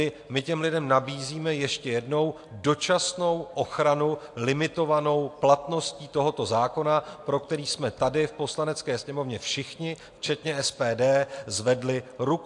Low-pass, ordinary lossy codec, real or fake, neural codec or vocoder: 10.8 kHz; AAC, 64 kbps; real; none